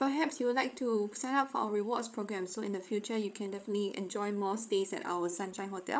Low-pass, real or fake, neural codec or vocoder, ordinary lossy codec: none; fake; codec, 16 kHz, 8 kbps, FreqCodec, larger model; none